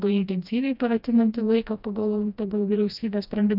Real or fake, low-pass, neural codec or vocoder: fake; 5.4 kHz; codec, 16 kHz, 1 kbps, FreqCodec, smaller model